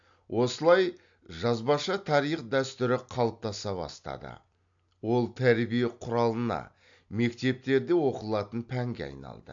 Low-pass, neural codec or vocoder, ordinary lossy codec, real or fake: 7.2 kHz; none; AAC, 64 kbps; real